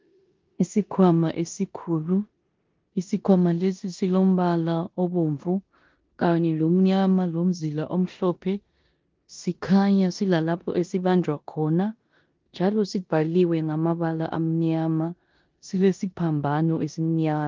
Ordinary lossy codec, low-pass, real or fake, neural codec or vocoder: Opus, 32 kbps; 7.2 kHz; fake; codec, 16 kHz in and 24 kHz out, 0.9 kbps, LongCat-Audio-Codec, four codebook decoder